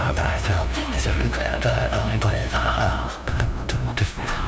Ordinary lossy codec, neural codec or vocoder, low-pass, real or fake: none; codec, 16 kHz, 0.5 kbps, FunCodec, trained on LibriTTS, 25 frames a second; none; fake